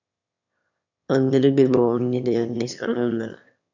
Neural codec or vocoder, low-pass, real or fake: autoencoder, 22.05 kHz, a latent of 192 numbers a frame, VITS, trained on one speaker; 7.2 kHz; fake